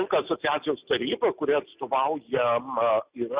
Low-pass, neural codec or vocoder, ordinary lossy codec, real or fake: 3.6 kHz; none; Opus, 64 kbps; real